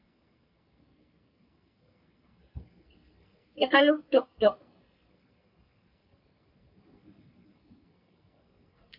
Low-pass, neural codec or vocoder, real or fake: 5.4 kHz; codec, 44.1 kHz, 2.6 kbps, SNAC; fake